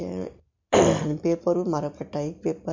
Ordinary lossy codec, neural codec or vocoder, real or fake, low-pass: MP3, 64 kbps; none; real; 7.2 kHz